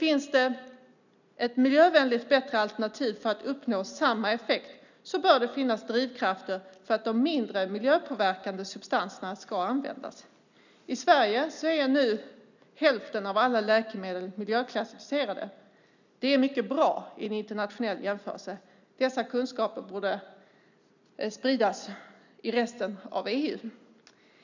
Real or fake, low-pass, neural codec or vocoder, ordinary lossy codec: real; 7.2 kHz; none; none